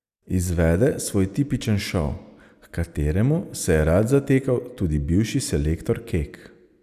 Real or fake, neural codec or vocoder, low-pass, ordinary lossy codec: real; none; 14.4 kHz; none